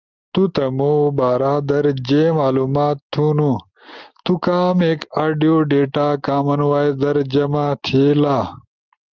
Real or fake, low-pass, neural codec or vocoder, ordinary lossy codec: real; 7.2 kHz; none; Opus, 32 kbps